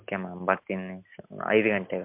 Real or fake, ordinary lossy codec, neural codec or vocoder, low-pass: real; MP3, 32 kbps; none; 3.6 kHz